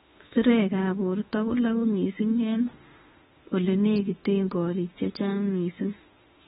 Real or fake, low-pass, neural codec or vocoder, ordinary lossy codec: fake; 19.8 kHz; autoencoder, 48 kHz, 32 numbers a frame, DAC-VAE, trained on Japanese speech; AAC, 16 kbps